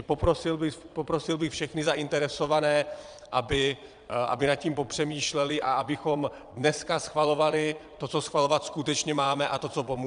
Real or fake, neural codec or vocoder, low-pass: fake; vocoder, 22.05 kHz, 80 mel bands, Vocos; 9.9 kHz